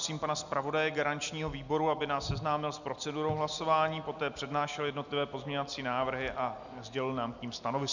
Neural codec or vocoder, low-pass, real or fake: none; 7.2 kHz; real